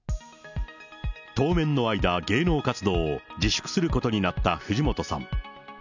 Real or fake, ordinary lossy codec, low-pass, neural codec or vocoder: real; none; 7.2 kHz; none